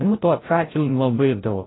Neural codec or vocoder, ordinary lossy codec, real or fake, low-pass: codec, 16 kHz, 0.5 kbps, FreqCodec, larger model; AAC, 16 kbps; fake; 7.2 kHz